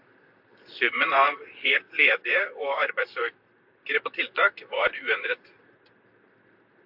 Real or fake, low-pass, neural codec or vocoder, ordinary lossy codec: fake; 5.4 kHz; vocoder, 44.1 kHz, 128 mel bands, Pupu-Vocoder; AAC, 48 kbps